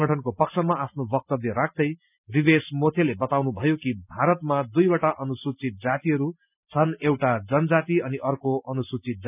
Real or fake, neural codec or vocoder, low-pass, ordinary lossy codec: real; none; 3.6 kHz; none